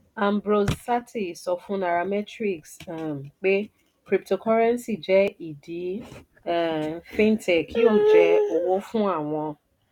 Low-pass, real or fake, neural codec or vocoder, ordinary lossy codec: 19.8 kHz; real; none; none